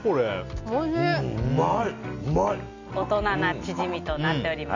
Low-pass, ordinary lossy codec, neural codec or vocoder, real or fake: 7.2 kHz; none; none; real